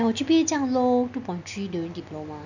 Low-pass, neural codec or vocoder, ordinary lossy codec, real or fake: 7.2 kHz; none; none; real